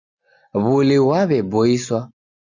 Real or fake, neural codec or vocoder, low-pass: real; none; 7.2 kHz